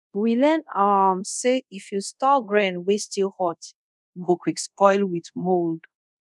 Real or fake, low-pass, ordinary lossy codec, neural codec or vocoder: fake; none; none; codec, 24 kHz, 0.5 kbps, DualCodec